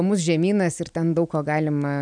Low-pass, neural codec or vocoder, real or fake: 9.9 kHz; none; real